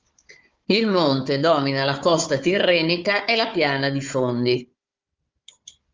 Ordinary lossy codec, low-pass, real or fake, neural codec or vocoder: Opus, 32 kbps; 7.2 kHz; fake; codec, 16 kHz, 4 kbps, FunCodec, trained on Chinese and English, 50 frames a second